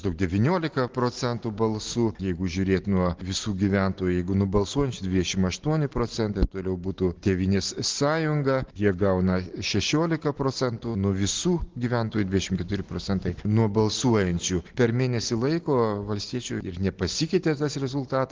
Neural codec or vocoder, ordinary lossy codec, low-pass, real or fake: none; Opus, 16 kbps; 7.2 kHz; real